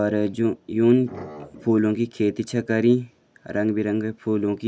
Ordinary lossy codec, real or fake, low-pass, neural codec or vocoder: none; real; none; none